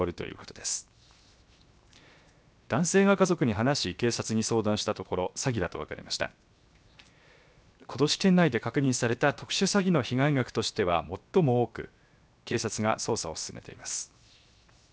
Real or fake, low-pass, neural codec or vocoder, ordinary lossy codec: fake; none; codec, 16 kHz, 0.7 kbps, FocalCodec; none